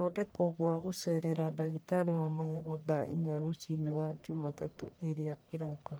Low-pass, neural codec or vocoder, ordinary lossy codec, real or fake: none; codec, 44.1 kHz, 1.7 kbps, Pupu-Codec; none; fake